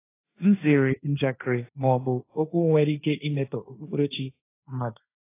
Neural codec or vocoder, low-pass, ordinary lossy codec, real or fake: codec, 16 kHz, 1.1 kbps, Voila-Tokenizer; 3.6 kHz; AAC, 24 kbps; fake